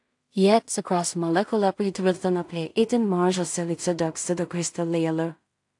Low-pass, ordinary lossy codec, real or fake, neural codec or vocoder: 10.8 kHz; AAC, 64 kbps; fake; codec, 16 kHz in and 24 kHz out, 0.4 kbps, LongCat-Audio-Codec, two codebook decoder